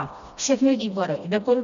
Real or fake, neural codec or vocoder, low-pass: fake; codec, 16 kHz, 1 kbps, FreqCodec, smaller model; 7.2 kHz